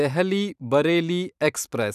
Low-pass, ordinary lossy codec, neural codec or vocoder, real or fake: 14.4 kHz; none; vocoder, 44.1 kHz, 128 mel bands every 512 samples, BigVGAN v2; fake